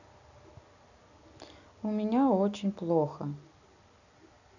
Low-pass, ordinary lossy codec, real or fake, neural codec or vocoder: 7.2 kHz; none; real; none